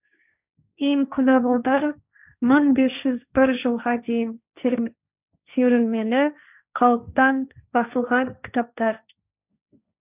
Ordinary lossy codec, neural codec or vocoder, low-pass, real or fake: none; codec, 16 kHz, 1.1 kbps, Voila-Tokenizer; 3.6 kHz; fake